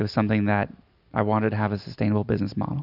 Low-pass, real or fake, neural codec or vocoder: 5.4 kHz; real; none